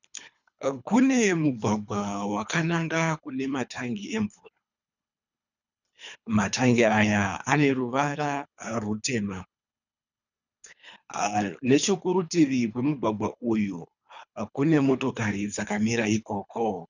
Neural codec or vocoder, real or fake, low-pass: codec, 24 kHz, 3 kbps, HILCodec; fake; 7.2 kHz